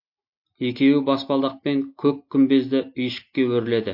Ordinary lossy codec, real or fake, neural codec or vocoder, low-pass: MP3, 32 kbps; real; none; 5.4 kHz